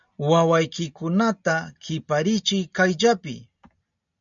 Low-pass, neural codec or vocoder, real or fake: 7.2 kHz; none; real